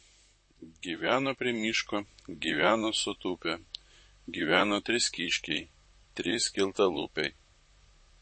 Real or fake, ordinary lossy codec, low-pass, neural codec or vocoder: fake; MP3, 32 kbps; 9.9 kHz; vocoder, 44.1 kHz, 128 mel bands, Pupu-Vocoder